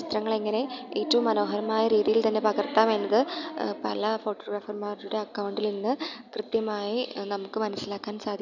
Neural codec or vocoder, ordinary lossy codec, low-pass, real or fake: none; none; 7.2 kHz; real